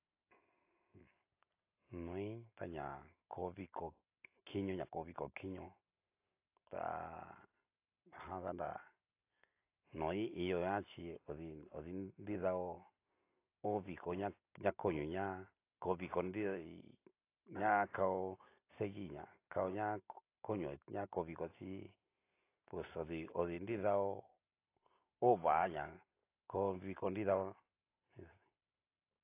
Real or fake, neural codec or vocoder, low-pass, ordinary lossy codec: real; none; 3.6 kHz; AAC, 24 kbps